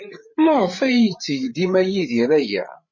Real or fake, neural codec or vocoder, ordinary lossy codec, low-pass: fake; codec, 16 kHz, 8 kbps, FreqCodec, larger model; MP3, 32 kbps; 7.2 kHz